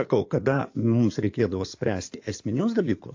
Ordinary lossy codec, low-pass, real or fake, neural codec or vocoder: AAC, 48 kbps; 7.2 kHz; fake; codec, 16 kHz in and 24 kHz out, 2.2 kbps, FireRedTTS-2 codec